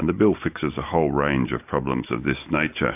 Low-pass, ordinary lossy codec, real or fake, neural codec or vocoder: 3.6 kHz; AAC, 32 kbps; real; none